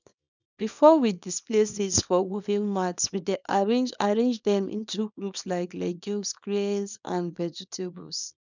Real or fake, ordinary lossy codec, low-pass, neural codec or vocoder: fake; none; 7.2 kHz; codec, 24 kHz, 0.9 kbps, WavTokenizer, small release